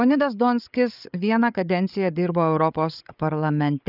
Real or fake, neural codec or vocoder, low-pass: fake; codec, 16 kHz, 8 kbps, FreqCodec, larger model; 5.4 kHz